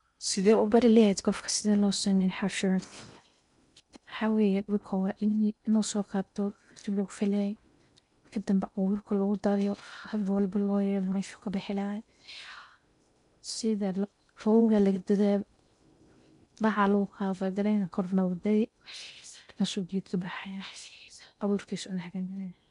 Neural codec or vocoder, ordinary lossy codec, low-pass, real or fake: codec, 16 kHz in and 24 kHz out, 0.6 kbps, FocalCodec, streaming, 4096 codes; none; 10.8 kHz; fake